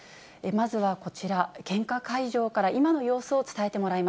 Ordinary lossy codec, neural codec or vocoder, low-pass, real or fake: none; none; none; real